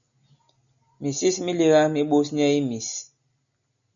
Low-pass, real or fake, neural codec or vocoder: 7.2 kHz; real; none